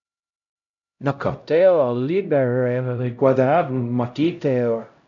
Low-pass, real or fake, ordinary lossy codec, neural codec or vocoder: 7.2 kHz; fake; none; codec, 16 kHz, 0.5 kbps, X-Codec, HuBERT features, trained on LibriSpeech